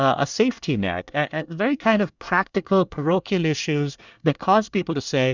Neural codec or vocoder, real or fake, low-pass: codec, 24 kHz, 1 kbps, SNAC; fake; 7.2 kHz